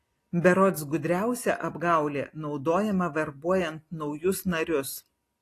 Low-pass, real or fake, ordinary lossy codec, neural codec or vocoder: 14.4 kHz; real; AAC, 48 kbps; none